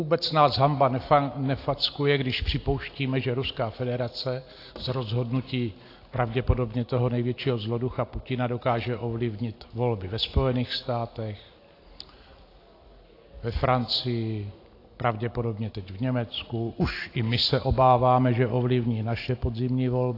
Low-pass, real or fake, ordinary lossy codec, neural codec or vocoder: 5.4 kHz; real; AAC, 32 kbps; none